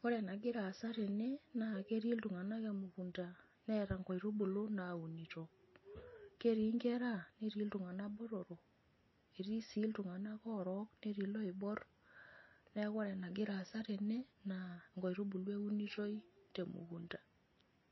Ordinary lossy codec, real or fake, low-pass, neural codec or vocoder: MP3, 24 kbps; real; 7.2 kHz; none